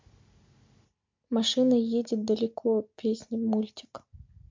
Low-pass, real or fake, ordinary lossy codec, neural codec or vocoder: 7.2 kHz; real; MP3, 48 kbps; none